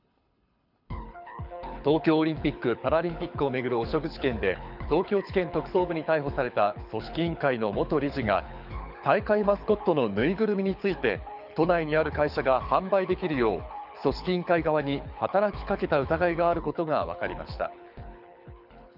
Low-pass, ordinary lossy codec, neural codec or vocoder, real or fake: 5.4 kHz; none; codec, 24 kHz, 6 kbps, HILCodec; fake